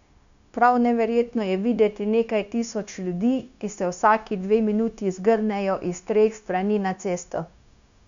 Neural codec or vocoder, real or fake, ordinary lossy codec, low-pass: codec, 16 kHz, 0.9 kbps, LongCat-Audio-Codec; fake; none; 7.2 kHz